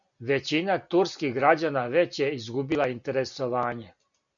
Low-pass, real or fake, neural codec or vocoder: 7.2 kHz; real; none